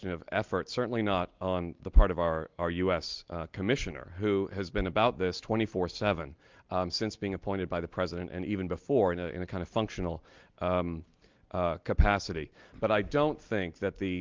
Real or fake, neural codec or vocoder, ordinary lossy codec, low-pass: real; none; Opus, 32 kbps; 7.2 kHz